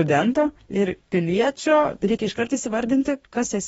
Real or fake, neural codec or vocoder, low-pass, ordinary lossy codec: fake; codec, 44.1 kHz, 2.6 kbps, DAC; 19.8 kHz; AAC, 24 kbps